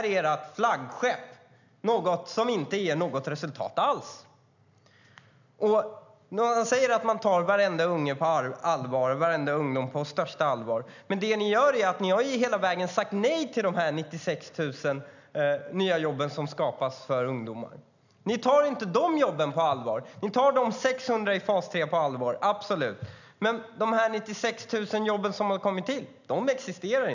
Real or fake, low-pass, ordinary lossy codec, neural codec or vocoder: real; 7.2 kHz; none; none